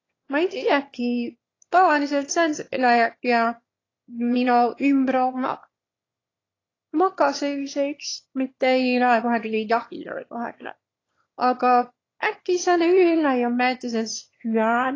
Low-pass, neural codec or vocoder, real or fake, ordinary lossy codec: 7.2 kHz; autoencoder, 22.05 kHz, a latent of 192 numbers a frame, VITS, trained on one speaker; fake; AAC, 32 kbps